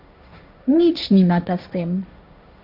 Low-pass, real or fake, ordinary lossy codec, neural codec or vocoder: 5.4 kHz; fake; none; codec, 16 kHz, 1.1 kbps, Voila-Tokenizer